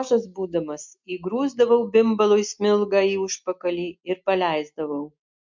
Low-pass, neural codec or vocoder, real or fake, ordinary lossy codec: 7.2 kHz; none; real; MP3, 64 kbps